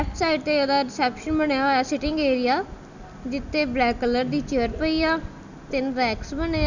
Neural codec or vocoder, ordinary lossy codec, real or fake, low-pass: none; none; real; 7.2 kHz